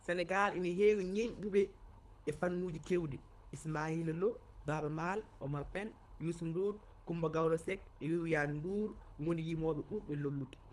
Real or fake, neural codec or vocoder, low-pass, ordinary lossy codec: fake; codec, 24 kHz, 3 kbps, HILCodec; none; none